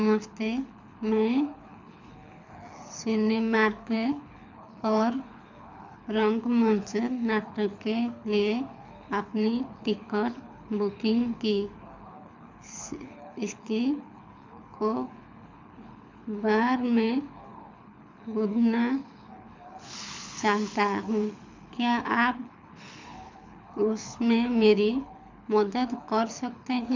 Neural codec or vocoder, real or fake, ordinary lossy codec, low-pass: codec, 24 kHz, 6 kbps, HILCodec; fake; MP3, 64 kbps; 7.2 kHz